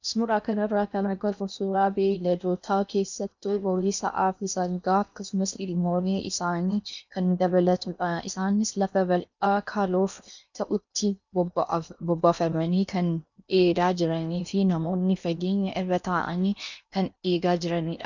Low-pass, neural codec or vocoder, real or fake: 7.2 kHz; codec, 16 kHz in and 24 kHz out, 0.8 kbps, FocalCodec, streaming, 65536 codes; fake